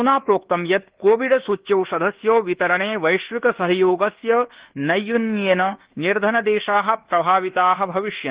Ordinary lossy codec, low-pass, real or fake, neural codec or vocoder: Opus, 16 kbps; 3.6 kHz; fake; codec, 24 kHz, 1.2 kbps, DualCodec